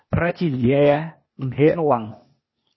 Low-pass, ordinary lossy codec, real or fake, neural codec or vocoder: 7.2 kHz; MP3, 24 kbps; fake; codec, 16 kHz, 0.8 kbps, ZipCodec